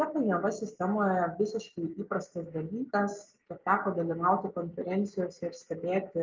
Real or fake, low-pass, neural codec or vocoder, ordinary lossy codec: real; 7.2 kHz; none; Opus, 32 kbps